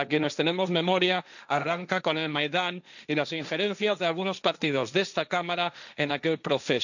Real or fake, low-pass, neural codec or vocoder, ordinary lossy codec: fake; 7.2 kHz; codec, 16 kHz, 1.1 kbps, Voila-Tokenizer; none